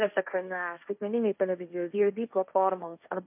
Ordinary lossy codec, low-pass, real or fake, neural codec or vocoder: MP3, 32 kbps; 3.6 kHz; fake; codec, 16 kHz, 1.1 kbps, Voila-Tokenizer